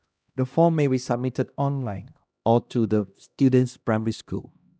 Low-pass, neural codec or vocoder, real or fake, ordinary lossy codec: none; codec, 16 kHz, 1 kbps, X-Codec, HuBERT features, trained on LibriSpeech; fake; none